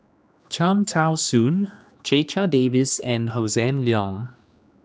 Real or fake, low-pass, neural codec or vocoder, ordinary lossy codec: fake; none; codec, 16 kHz, 2 kbps, X-Codec, HuBERT features, trained on general audio; none